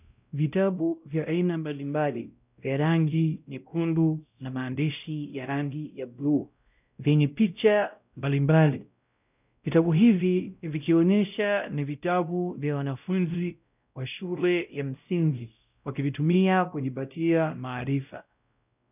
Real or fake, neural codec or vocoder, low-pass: fake; codec, 16 kHz, 0.5 kbps, X-Codec, WavLM features, trained on Multilingual LibriSpeech; 3.6 kHz